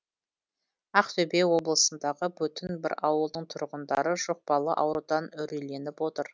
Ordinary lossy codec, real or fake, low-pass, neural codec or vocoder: none; real; none; none